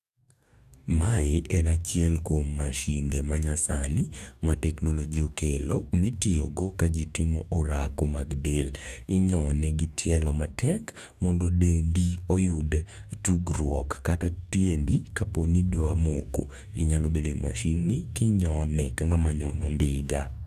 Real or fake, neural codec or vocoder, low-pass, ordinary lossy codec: fake; codec, 44.1 kHz, 2.6 kbps, DAC; 14.4 kHz; none